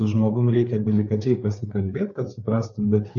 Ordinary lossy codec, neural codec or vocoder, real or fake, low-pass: AAC, 64 kbps; codec, 16 kHz, 4 kbps, FreqCodec, larger model; fake; 7.2 kHz